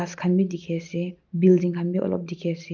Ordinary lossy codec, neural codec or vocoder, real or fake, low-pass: Opus, 24 kbps; none; real; 7.2 kHz